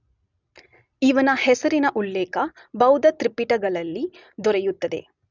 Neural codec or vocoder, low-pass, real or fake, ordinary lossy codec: none; 7.2 kHz; real; none